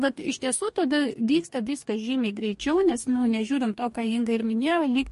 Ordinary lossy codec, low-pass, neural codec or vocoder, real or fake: MP3, 48 kbps; 14.4 kHz; codec, 44.1 kHz, 2.6 kbps, DAC; fake